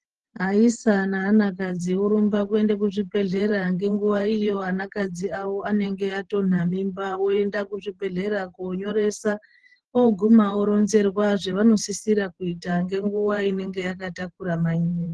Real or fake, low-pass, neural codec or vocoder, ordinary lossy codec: fake; 10.8 kHz; vocoder, 44.1 kHz, 128 mel bands every 512 samples, BigVGAN v2; Opus, 16 kbps